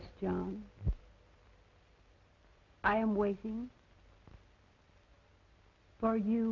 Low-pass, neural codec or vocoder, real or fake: 7.2 kHz; none; real